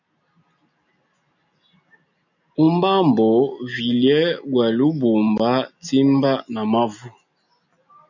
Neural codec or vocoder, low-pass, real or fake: none; 7.2 kHz; real